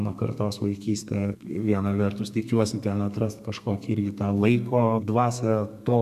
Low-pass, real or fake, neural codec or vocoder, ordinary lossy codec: 14.4 kHz; fake; codec, 32 kHz, 1.9 kbps, SNAC; AAC, 96 kbps